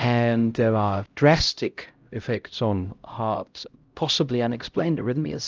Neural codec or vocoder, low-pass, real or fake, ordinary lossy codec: codec, 16 kHz, 0.5 kbps, X-Codec, HuBERT features, trained on LibriSpeech; 7.2 kHz; fake; Opus, 24 kbps